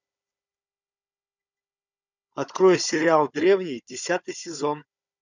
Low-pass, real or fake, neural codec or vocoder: 7.2 kHz; fake; codec, 16 kHz, 16 kbps, FunCodec, trained on Chinese and English, 50 frames a second